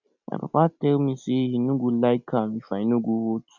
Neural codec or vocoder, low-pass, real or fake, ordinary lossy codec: none; 7.2 kHz; real; none